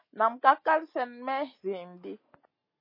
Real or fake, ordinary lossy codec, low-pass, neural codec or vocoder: real; MP3, 24 kbps; 5.4 kHz; none